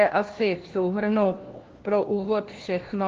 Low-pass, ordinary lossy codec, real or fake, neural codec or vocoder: 7.2 kHz; Opus, 16 kbps; fake; codec, 16 kHz, 1 kbps, FunCodec, trained on LibriTTS, 50 frames a second